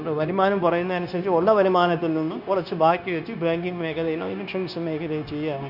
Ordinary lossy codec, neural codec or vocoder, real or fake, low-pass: none; codec, 16 kHz, 0.9 kbps, LongCat-Audio-Codec; fake; 5.4 kHz